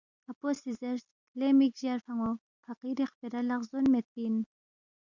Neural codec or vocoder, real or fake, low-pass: none; real; 7.2 kHz